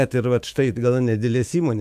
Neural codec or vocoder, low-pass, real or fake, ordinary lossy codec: autoencoder, 48 kHz, 128 numbers a frame, DAC-VAE, trained on Japanese speech; 14.4 kHz; fake; AAC, 96 kbps